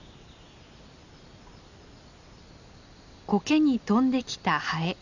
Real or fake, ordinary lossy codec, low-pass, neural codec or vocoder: real; none; 7.2 kHz; none